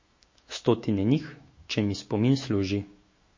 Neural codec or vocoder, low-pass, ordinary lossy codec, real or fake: autoencoder, 48 kHz, 128 numbers a frame, DAC-VAE, trained on Japanese speech; 7.2 kHz; MP3, 32 kbps; fake